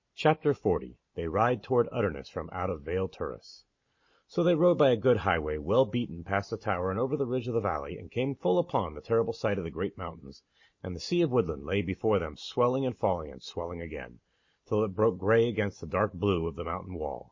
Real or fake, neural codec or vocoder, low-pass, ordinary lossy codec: real; none; 7.2 kHz; MP3, 32 kbps